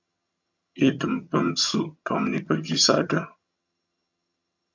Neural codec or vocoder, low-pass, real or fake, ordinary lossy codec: vocoder, 22.05 kHz, 80 mel bands, HiFi-GAN; 7.2 kHz; fake; MP3, 48 kbps